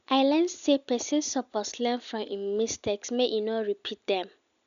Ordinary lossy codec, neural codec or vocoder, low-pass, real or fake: none; none; 7.2 kHz; real